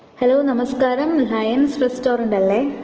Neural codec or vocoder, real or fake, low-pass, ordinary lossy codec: none; real; 7.2 kHz; Opus, 16 kbps